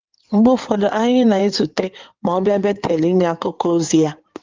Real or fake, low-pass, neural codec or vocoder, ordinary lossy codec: fake; 7.2 kHz; codec, 16 kHz in and 24 kHz out, 2.2 kbps, FireRedTTS-2 codec; Opus, 24 kbps